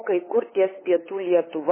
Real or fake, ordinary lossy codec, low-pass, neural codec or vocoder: fake; MP3, 16 kbps; 3.6 kHz; codec, 16 kHz, 8 kbps, FunCodec, trained on LibriTTS, 25 frames a second